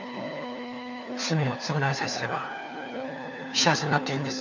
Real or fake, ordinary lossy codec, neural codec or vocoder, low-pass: fake; none; codec, 16 kHz, 4 kbps, FunCodec, trained on LibriTTS, 50 frames a second; 7.2 kHz